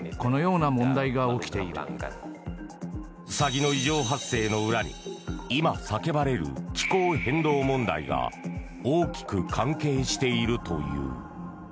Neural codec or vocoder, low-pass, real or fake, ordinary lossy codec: none; none; real; none